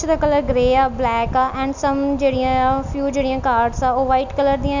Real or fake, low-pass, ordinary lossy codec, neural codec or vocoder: real; 7.2 kHz; none; none